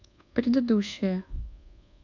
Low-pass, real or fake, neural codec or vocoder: 7.2 kHz; fake; codec, 24 kHz, 1.2 kbps, DualCodec